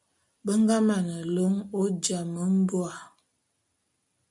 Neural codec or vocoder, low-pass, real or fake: none; 10.8 kHz; real